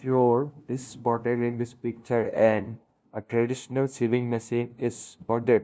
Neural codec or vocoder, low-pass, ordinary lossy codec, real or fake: codec, 16 kHz, 0.5 kbps, FunCodec, trained on LibriTTS, 25 frames a second; none; none; fake